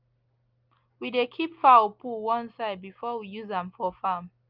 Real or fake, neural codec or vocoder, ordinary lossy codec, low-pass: real; none; Opus, 24 kbps; 5.4 kHz